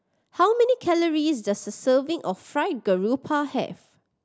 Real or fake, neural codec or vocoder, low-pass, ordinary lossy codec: real; none; none; none